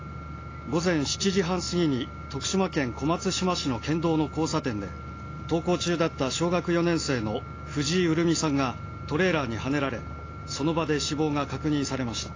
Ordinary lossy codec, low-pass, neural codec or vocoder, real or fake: AAC, 32 kbps; 7.2 kHz; none; real